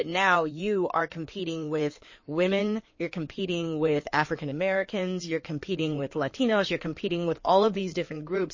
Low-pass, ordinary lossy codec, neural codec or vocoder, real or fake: 7.2 kHz; MP3, 32 kbps; codec, 16 kHz in and 24 kHz out, 2.2 kbps, FireRedTTS-2 codec; fake